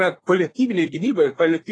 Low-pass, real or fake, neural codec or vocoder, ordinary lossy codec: 9.9 kHz; fake; codec, 24 kHz, 1 kbps, SNAC; AAC, 32 kbps